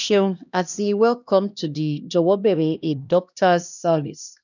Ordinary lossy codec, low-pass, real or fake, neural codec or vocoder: none; 7.2 kHz; fake; codec, 16 kHz, 1 kbps, X-Codec, HuBERT features, trained on LibriSpeech